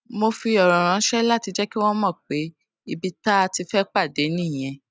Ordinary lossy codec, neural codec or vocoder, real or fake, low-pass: none; none; real; none